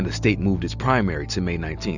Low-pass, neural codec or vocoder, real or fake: 7.2 kHz; none; real